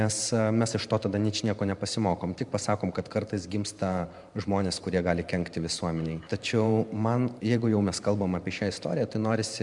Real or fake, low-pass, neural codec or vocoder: fake; 10.8 kHz; vocoder, 44.1 kHz, 128 mel bands every 512 samples, BigVGAN v2